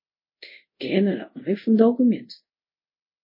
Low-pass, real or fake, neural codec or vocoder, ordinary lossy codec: 5.4 kHz; fake; codec, 24 kHz, 0.5 kbps, DualCodec; MP3, 24 kbps